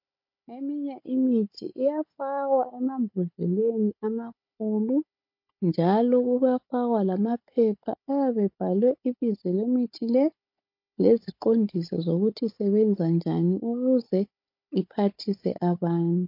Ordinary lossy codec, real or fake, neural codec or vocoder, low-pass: MP3, 32 kbps; fake; codec, 16 kHz, 16 kbps, FunCodec, trained on Chinese and English, 50 frames a second; 5.4 kHz